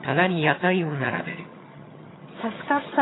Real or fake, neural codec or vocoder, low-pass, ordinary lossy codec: fake; vocoder, 22.05 kHz, 80 mel bands, HiFi-GAN; 7.2 kHz; AAC, 16 kbps